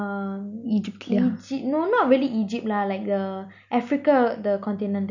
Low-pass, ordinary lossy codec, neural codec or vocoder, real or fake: 7.2 kHz; none; none; real